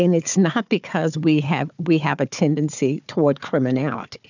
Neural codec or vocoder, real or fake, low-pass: codec, 16 kHz, 4 kbps, FunCodec, trained on Chinese and English, 50 frames a second; fake; 7.2 kHz